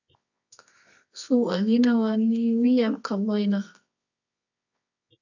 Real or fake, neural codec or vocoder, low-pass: fake; codec, 24 kHz, 0.9 kbps, WavTokenizer, medium music audio release; 7.2 kHz